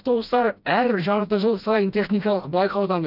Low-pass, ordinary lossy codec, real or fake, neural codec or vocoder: 5.4 kHz; none; fake; codec, 16 kHz, 1 kbps, FreqCodec, smaller model